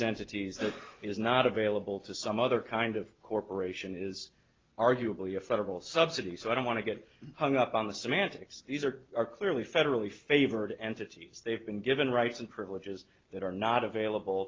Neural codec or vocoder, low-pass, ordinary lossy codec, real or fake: none; 7.2 kHz; Opus, 24 kbps; real